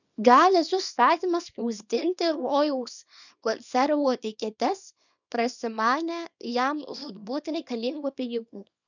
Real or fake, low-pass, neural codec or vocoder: fake; 7.2 kHz; codec, 24 kHz, 0.9 kbps, WavTokenizer, small release